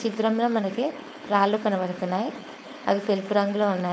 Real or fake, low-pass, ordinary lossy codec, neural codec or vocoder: fake; none; none; codec, 16 kHz, 4.8 kbps, FACodec